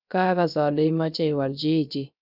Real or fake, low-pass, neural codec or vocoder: fake; 5.4 kHz; codec, 16 kHz, 0.3 kbps, FocalCodec